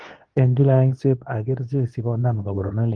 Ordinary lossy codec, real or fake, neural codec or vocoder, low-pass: Opus, 24 kbps; fake; codec, 24 kHz, 0.9 kbps, WavTokenizer, medium speech release version 2; 9.9 kHz